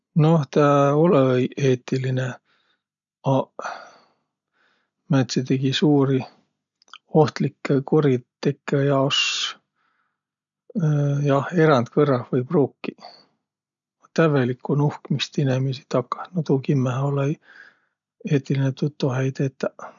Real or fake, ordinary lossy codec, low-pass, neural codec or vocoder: real; none; 7.2 kHz; none